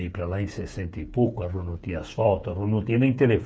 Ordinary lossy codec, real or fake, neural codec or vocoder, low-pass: none; fake; codec, 16 kHz, 8 kbps, FreqCodec, smaller model; none